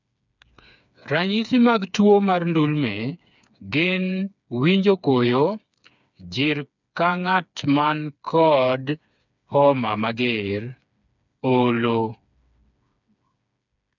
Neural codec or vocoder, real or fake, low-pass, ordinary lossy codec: codec, 16 kHz, 4 kbps, FreqCodec, smaller model; fake; 7.2 kHz; none